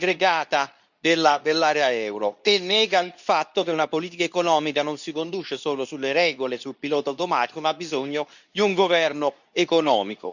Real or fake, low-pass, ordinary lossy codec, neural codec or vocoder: fake; 7.2 kHz; none; codec, 24 kHz, 0.9 kbps, WavTokenizer, medium speech release version 2